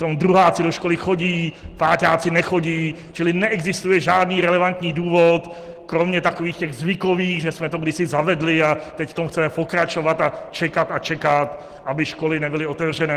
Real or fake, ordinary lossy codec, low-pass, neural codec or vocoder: real; Opus, 16 kbps; 14.4 kHz; none